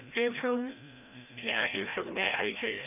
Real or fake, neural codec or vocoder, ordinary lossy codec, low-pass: fake; codec, 16 kHz, 1 kbps, FreqCodec, larger model; none; 3.6 kHz